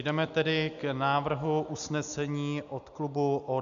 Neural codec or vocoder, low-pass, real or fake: none; 7.2 kHz; real